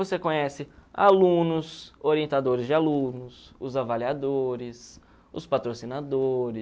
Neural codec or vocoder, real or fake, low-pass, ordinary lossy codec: none; real; none; none